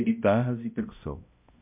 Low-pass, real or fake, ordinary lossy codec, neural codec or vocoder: 3.6 kHz; fake; MP3, 32 kbps; codec, 16 kHz, 0.5 kbps, X-Codec, HuBERT features, trained on balanced general audio